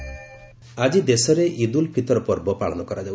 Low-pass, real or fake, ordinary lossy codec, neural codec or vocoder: none; real; none; none